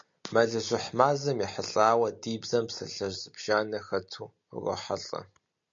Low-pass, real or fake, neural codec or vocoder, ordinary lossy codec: 7.2 kHz; real; none; MP3, 48 kbps